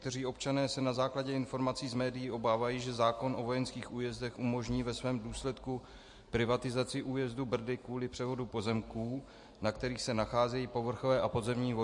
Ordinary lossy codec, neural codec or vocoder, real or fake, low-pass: MP3, 48 kbps; none; real; 10.8 kHz